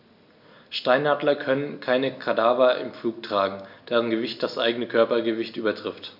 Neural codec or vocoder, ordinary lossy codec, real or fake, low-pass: none; none; real; 5.4 kHz